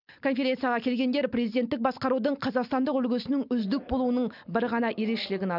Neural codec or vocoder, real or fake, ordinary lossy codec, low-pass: none; real; none; 5.4 kHz